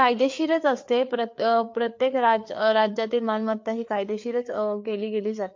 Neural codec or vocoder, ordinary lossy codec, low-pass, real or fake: codec, 16 kHz, 4 kbps, FreqCodec, larger model; MP3, 48 kbps; 7.2 kHz; fake